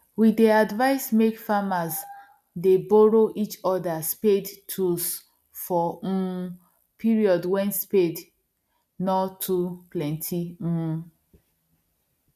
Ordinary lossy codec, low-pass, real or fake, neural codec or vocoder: none; 14.4 kHz; real; none